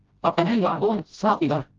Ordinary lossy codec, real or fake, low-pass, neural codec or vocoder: Opus, 32 kbps; fake; 7.2 kHz; codec, 16 kHz, 0.5 kbps, FreqCodec, smaller model